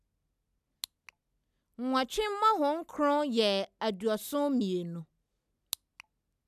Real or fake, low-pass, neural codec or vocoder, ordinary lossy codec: real; 14.4 kHz; none; none